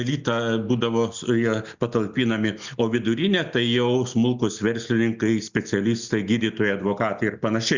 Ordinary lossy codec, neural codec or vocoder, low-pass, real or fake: Opus, 64 kbps; none; 7.2 kHz; real